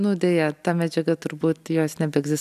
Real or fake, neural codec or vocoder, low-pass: real; none; 14.4 kHz